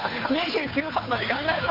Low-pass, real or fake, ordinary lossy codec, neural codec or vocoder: 5.4 kHz; fake; none; codec, 16 kHz, 2 kbps, FunCodec, trained on Chinese and English, 25 frames a second